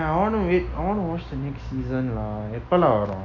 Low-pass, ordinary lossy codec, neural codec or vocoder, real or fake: 7.2 kHz; none; none; real